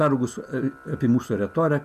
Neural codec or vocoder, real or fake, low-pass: none; real; 14.4 kHz